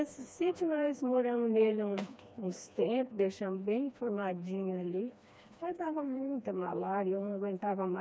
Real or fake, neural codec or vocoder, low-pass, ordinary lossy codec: fake; codec, 16 kHz, 2 kbps, FreqCodec, smaller model; none; none